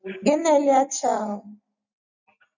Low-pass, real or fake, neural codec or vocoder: 7.2 kHz; real; none